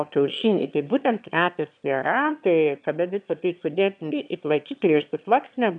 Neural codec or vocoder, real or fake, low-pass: autoencoder, 22.05 kHz, a latent of 192 numbers a frame, VITS, trained on one speaker; fake; 9.9 kHz